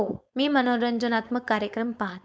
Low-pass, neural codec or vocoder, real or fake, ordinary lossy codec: none; codec, 16 kHz, 4.8 kbps, FACodec; fake; none